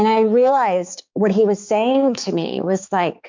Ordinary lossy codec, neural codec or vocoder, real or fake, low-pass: MP3, 64 kbps; codec, 16 kHz, 4 kbps, X-Codec, HuBERT features, trained on balanced general audio; fake; 7.2 kHz